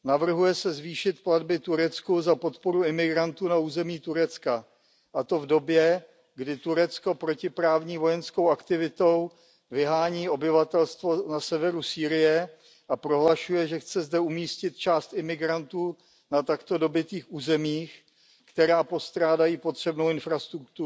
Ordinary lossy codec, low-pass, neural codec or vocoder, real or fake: none; none; none; real